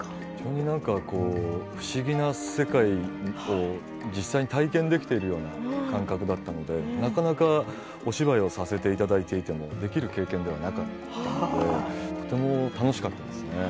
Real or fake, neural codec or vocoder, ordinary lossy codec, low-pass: real; none; none; none